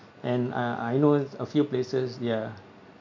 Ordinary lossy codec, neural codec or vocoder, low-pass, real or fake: MP3, 64 kbps; none; 7.2 kHz; real